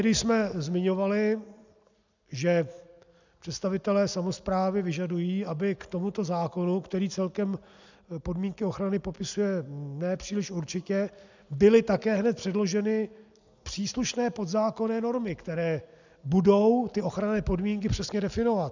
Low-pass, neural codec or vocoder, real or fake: 7.2 kHz; none; real